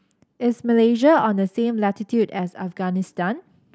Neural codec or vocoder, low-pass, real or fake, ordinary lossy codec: none; none; real; none